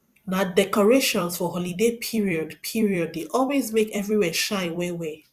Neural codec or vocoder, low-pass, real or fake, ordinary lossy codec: vocoder, 44.1 kHz, 128 mel bands every 256 samples, BigVGAN v2; 14.4 kHz; fake; Opus, 64 kbps